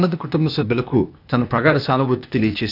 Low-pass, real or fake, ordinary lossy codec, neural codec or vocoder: 5.4 kHz; fake; none; codec, 16 kHz, 0.8 kbps, ZipCodec